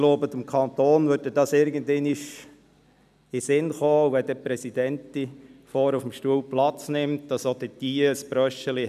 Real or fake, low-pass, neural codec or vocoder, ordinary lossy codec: real; 14.4 kHz; none; none